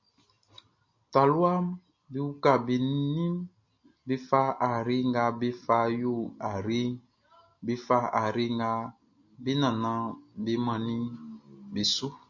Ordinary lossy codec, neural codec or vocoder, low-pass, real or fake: MP3, 48 kbps; none; 7.2 kHz; real